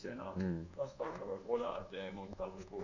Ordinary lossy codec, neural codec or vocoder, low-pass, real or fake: none; codec, 24 kHz, 1.2 kbps, DualCodec; 7.2 kHz; fake